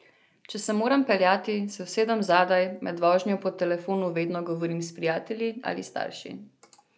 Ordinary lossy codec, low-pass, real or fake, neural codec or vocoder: none; none; real; none